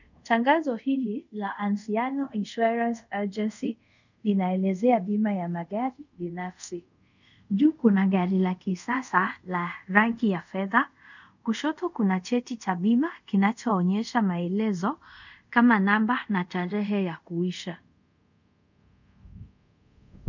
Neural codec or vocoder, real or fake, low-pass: codec, 24 kHz, 0.5 kbps, DualCodec; fake; 7.2 kHz